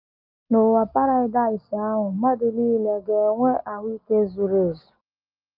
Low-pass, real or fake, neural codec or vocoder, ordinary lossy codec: 5.4 kHz; real; none; Opus, 32 kbps